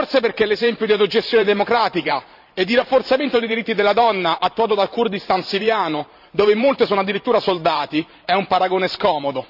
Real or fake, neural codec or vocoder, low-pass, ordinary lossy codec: fake; vocoder, 44.1 kHz, 128 mel bands every 512 samples, BigVGAN v2; 5.4 kHz; none